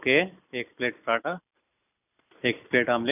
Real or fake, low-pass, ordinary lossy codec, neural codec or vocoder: real; 3.6 kHz; none; none